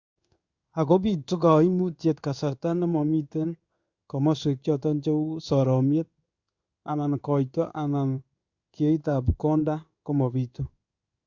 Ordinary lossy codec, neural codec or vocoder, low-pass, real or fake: Opus, 64 kbps; codec, 16 kHz in and 24 kHz out, 1 kbps, XY-Tokenizer; 7.2 kHz; fake